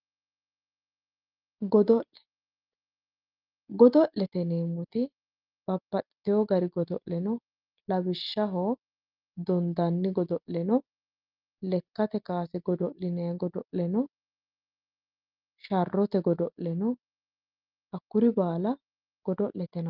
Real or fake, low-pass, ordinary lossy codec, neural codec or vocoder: real; 5.4 kHz; Opus, 24 kbps; none